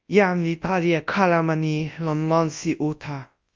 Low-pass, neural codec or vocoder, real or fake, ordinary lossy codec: 7.2 kHz; codec, 24 kHz, 0.9 kbps, WavTokenizer, large speech release; fake; Opus, 32 kbps